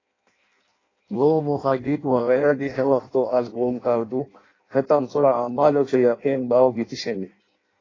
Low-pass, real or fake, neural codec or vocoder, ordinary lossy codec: 7.2 kHz; fake; codec, 16 kHz in and 24 kHz out, 0.6 kbps, FireRedTTS-2 codec; AAC, 32 kbps